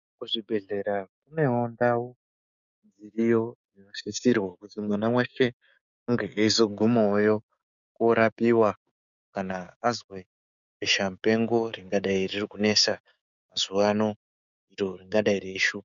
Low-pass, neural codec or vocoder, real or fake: 7.2 kHz; codec, 16 kHz, 6 kbps, DAC; fake